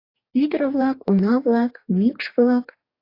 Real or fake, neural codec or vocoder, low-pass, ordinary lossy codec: fake; codec, 44.1 kHz, 2.6 kbps, DAC; 5.4 kHz; AAC, 32 kbps